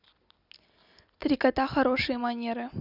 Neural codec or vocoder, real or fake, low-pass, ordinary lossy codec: none; real; 5.4 kHz; none